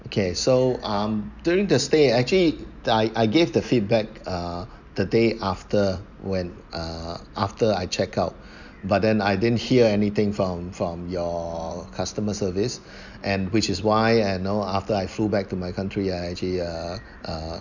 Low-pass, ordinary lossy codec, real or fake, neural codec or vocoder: 7.2 kHz; none; real; none